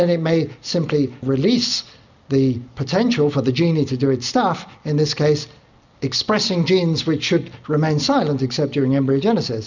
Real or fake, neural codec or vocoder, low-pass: real; none; 7.2 kHz